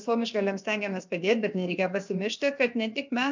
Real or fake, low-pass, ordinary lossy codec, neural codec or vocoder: fake; 7.2 kHz; MP3, 64 kbps; codec, 16 kHz, about 1 kbps, DyCAST, with the encoder's durations